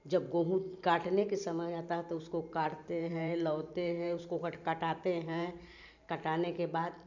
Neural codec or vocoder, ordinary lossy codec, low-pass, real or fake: vocoder, 44.1 kHz, 128 mel bands every 512 samples, BigVGAN v2; none; 7.2 kHz; fake